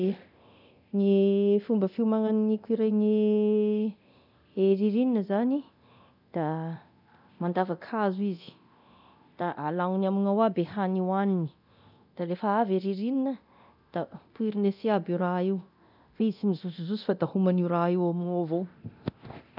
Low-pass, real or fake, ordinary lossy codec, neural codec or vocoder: 5.4 kHz; fake; none; codec, 24 kHz, 0.9 kbps, DualCodec